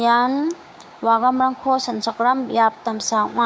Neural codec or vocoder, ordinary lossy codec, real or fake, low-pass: codec, 16 kHz, 6 kbps, DAC; none; fake; none